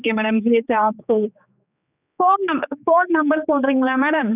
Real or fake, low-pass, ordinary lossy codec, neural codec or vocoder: fake; 3.6 kHz; none; codec, 16 kHz, 4 kbps, X-Codec, HuBERT features, trained on general audio